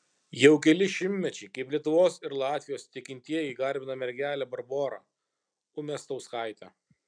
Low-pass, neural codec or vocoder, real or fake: 9.9 kHz; none; real